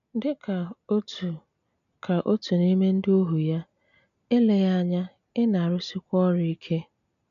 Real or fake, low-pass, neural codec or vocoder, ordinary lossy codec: real; 7.2 kHz; none; none